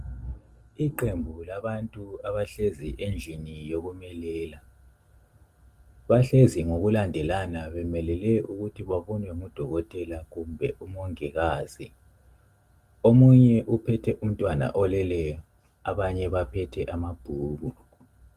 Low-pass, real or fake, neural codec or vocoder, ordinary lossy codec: 14.4 kHz; real; none; Opus, 24 kbps